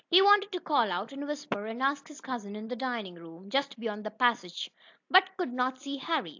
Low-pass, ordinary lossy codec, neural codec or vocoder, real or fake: 7.2 kHz; AAC, 48 kbps; none; real